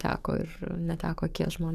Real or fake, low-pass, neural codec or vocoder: fake; 14.4 kHz; codec, 44.1 kHz, 7.8 kbps, DAC